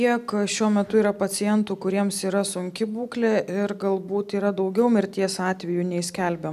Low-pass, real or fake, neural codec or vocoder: 14.4 kHz; real; none